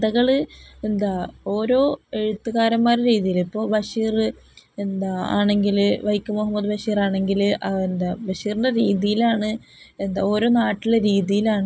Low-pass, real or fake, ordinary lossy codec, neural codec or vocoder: none; real; none; none